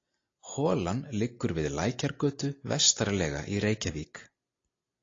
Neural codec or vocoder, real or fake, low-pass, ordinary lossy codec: none; real; 7.2 kHz; AAC, 32 kbps